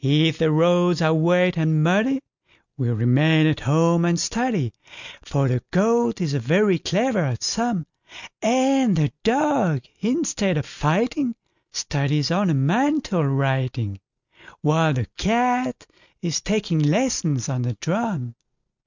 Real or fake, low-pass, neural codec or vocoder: real; 7.2 kHz; none